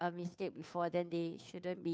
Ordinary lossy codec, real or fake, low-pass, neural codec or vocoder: none; fake; none; codec, 16 kHz, 2 kbps, FunCodec, trained on Chinese and English, 25 frames a second